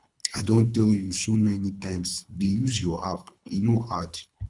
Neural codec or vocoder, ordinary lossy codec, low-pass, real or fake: codec, 24 kHz, 3 kbps, HILCodec; none; 10.8 kHz; fake